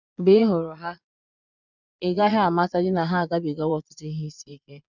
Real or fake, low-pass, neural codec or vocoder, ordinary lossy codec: fake; 7.2 kHz; vocoder, 22.05 kHz, 80 mel bands, Vocos; none